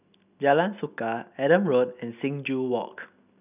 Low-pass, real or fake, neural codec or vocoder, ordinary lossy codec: 3.6 kHz; real; none; none